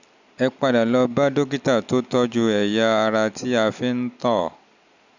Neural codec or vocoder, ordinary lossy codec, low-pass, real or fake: none; none; 7.2 kHz; real